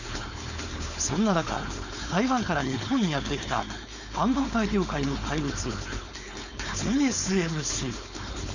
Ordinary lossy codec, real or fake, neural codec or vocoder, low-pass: none; fake; codec, 16 kHz, 4.8 kbps, FACodec; 7.2 kHz